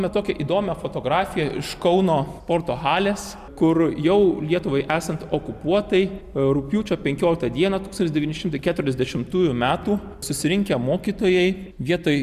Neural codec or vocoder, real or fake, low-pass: none; real; 14.4 kHz